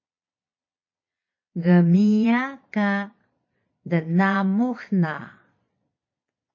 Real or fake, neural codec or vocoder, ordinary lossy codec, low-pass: fake; vocoder, 22.05 kHz, 80 mel bands, Vocos; MP3, 32 kbps; 7.2 kHz